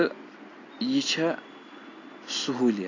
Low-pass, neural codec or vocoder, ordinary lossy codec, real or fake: 7.2 kHz; none; AAC, 32 kbps; real